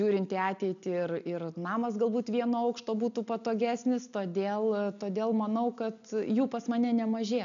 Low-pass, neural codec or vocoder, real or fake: 7.2 kHz; none; real